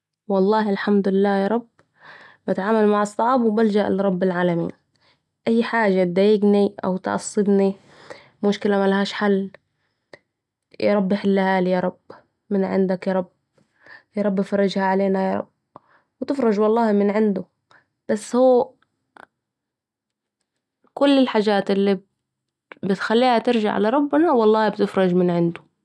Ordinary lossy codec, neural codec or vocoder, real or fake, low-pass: none; none; real; none